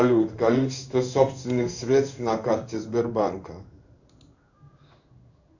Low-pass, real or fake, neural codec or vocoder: 7.2 kHz; fake; codec, 16 kHz in and 24 kHz out, 1 kbps, XY-Tokenizer